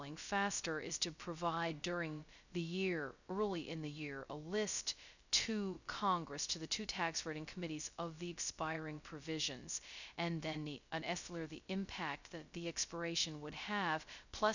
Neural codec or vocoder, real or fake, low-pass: codec, 16 kHz, 0.2 kbps, FocalCodec; fake; 7.2 kHz